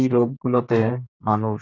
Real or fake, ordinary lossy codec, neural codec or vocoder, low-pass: fake; none; codec, 32 kHz, 1.9 kbps, SNAC; 7.2 kHz